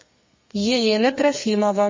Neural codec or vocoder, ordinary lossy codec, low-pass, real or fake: codec, 32 kHz, 1.9 kbps, SNAC; MP3, 32 kbps; 7.2 kHz; fake